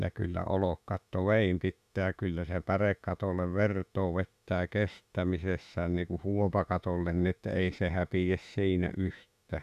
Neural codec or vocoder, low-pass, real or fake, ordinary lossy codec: autoencoder, 48 kHz, 32 numbers a frame, DAC-VAE, trained on Japanese speech; 14.4 kHz; fake; none